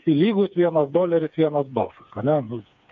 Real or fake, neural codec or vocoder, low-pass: fake; codec, 16 kHz, 4 kbps, FreqCodec, smaller model; 7.2 kHz